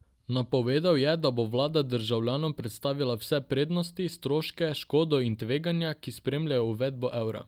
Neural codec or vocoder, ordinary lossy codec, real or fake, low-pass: none; Opus, 32 kbps; real; 19.8 kHz